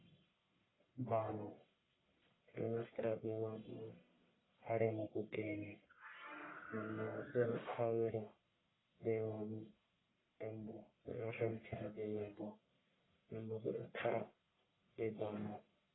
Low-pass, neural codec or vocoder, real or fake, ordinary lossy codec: 7.2 kHz; codec, 44.1 kHz, 1.7 kbps, Pupu-Codec; fake; AAC, 16 kbps